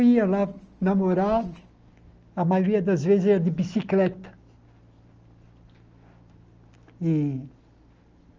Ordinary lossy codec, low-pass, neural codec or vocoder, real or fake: Opus, 24 kbps; 7.2 kHz; none; real